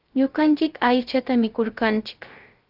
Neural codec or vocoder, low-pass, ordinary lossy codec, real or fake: codec, 16 kHz, 0.3 kbps, FocalCodec; 5.4 kHz; Opus, 32 kbps; fake